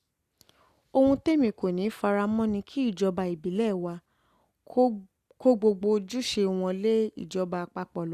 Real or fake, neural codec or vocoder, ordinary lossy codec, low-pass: real; none; Opus, 64 kbps; 14.4 kHz